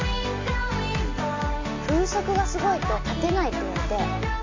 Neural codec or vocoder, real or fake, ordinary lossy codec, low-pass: none; real; MP3, 48 kbps; 7.2 kHz